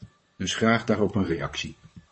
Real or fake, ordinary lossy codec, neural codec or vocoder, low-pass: fake; MP3, 32 kbps; vocoder, 44.1 kHz, 128 mel bands, Pupu-Vocoder; 10.8 kHz